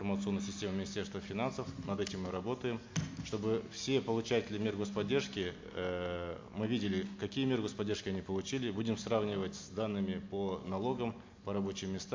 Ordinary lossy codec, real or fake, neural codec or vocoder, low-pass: MP3, 48 kbps; real; none; 7.2 kHz